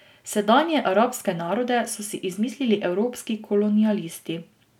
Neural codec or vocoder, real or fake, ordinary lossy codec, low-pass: none; real; none; 19.8 kHz